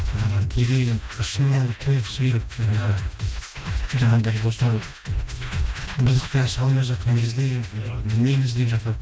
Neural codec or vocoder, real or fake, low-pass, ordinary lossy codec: codec, 16 kHz, 1 kbps, FreqCodec, smaller model; fake; none; none